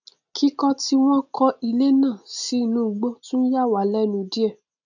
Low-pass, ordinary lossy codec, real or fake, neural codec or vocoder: 7.2 kHz; none; real; none